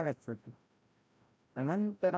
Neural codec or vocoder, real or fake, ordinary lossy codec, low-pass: codec, 16 kHz, 0.5 kbps, FreqCodec, larger model; fake; none; none